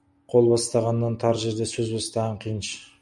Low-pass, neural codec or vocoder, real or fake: 10.8 kHz; none; real